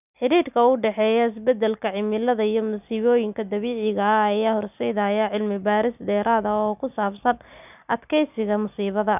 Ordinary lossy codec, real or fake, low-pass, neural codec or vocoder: none; real; 3.6 kHz; none